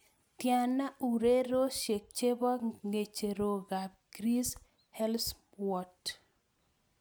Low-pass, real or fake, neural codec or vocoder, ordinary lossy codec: none; real; none; none